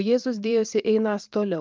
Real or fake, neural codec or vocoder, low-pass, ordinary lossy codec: fake; vocoder, 44.1 kHz, 128 mel bands every 512 samples, BigVGAN v2; 7.2 kHz; Opus, 24 kbps